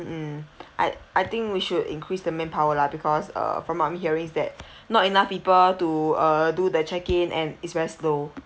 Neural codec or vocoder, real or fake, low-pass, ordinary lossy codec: none; real; none; none